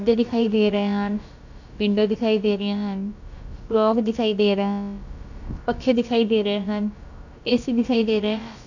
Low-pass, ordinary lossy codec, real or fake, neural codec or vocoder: 7.2 kHz; none; fake; codec, 16 kHz, about 1 kbps, DyCAST, with the encoder's durations